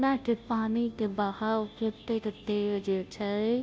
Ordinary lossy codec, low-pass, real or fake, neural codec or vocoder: none; none; fake; codec, 16 kHz, 0.5 kbps, FunCodec, trained on Chinese and English, 25 frames a second